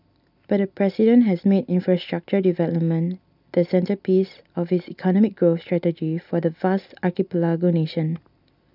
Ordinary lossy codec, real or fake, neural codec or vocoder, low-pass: none; real; none; 5.4 kHz